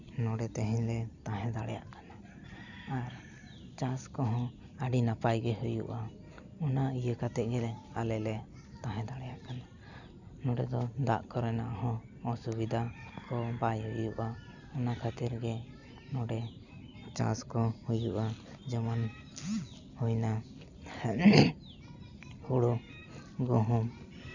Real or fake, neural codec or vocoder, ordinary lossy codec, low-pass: real; none; none; 7.2 kHz